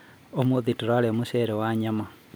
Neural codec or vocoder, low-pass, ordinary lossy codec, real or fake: none; none; none; real